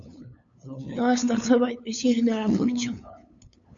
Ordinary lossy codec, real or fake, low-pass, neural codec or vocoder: MP3, 64 kbps; fake; 7.2 kHz; codec, 16 kHz, 8 kbps, FunCodec, trained on LibriTTS, 25 frames a second